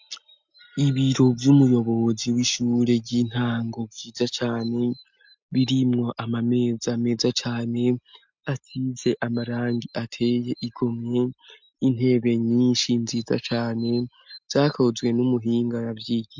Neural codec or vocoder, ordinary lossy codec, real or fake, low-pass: none; MP3, 64 kbps; real; 7.2 kHz